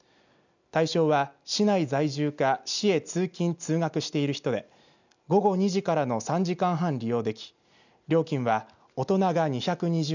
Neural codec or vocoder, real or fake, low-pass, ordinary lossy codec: none; real; 7.2 kHz; none